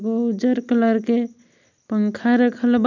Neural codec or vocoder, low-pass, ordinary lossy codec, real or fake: none; 7.2 kHz; none; real